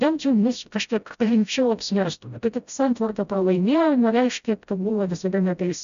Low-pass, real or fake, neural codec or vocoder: 7.2 kHz; fake; codec, 16 kHz, 0.5 kbps, FreqCodec, smaller model